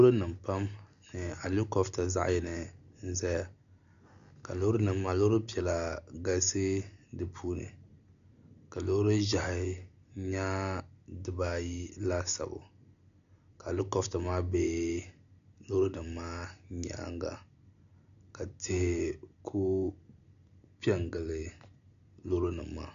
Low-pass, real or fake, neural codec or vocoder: 7.2 kHz; real; none